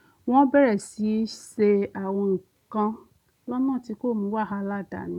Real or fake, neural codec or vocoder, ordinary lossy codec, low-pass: real; none; none; 19.8 kHz